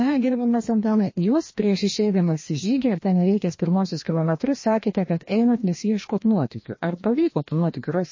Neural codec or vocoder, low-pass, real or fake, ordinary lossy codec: codec, 16 kHz, 1 kbps, FreqCodec, larger model; 7.2 kHz; fake; MP3, 32 kbps